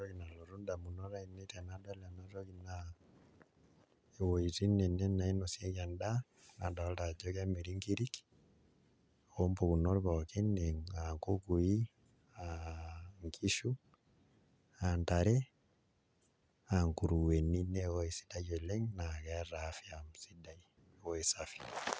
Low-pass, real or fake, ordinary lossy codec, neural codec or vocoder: none; real; none; none